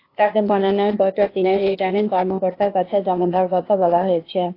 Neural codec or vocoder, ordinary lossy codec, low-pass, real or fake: codec, 16 kHz, 0.8 kbps, ZipCodec; AAC, 32 kbps; 5.4 kHz; fake